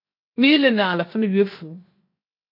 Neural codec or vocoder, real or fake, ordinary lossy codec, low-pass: codec, 16 kHz, 0.7 kbps, FocalCodec; fake; MP3, 32 kbps; 5.4 kHz